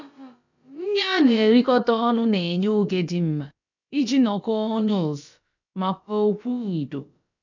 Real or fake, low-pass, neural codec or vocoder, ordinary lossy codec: fake; 7.2 kHz; codec, 16 kHz, about 1 kbps, DyCAST, with the encoder's durations; none